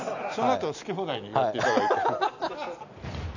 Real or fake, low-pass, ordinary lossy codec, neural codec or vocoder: real; 7.2 kHz; none; none